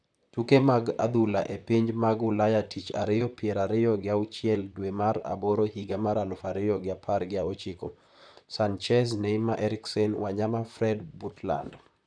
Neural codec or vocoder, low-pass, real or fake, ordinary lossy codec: vocoder, 44.1 kHz, 128 mel bands, Pupu-Vocoder; 9.9 kHz; fake; none